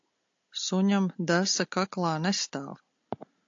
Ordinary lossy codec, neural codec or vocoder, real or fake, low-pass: AAC, 48 kbps; none; real; 7.2 kHz